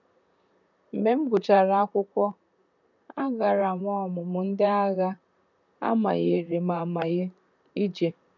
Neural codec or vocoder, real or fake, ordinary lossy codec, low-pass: vocoder, 44.1 kHz, 128 mel bands, Pupu-Vocoder; fake; none; 7.2 kHz